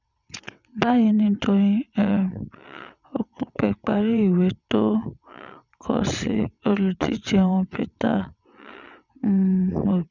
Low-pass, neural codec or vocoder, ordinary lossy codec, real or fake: 7.2 kHz; vocoder, 22.05 kHz, 80 mel bands, WaveNeXt; none; fake